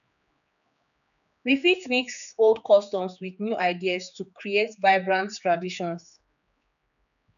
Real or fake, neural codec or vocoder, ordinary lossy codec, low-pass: fake; codec, 16 kHz, 4 kbps, X-Codec, HuBERT features, trained on general audio; none; 7.2 kHz